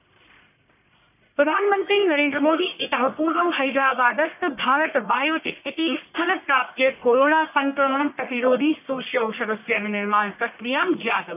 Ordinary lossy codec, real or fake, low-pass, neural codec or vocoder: none; fake; 3.6 kHz; codec, 44.1 kHz, 1.7 kbps, Pupu-Codec